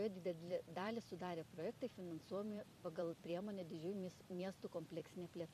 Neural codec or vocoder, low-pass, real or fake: vocoder, 44.1 kHz, 128 mel bands every 256 samples, BigVGAN v2; 14.4 kHz; fake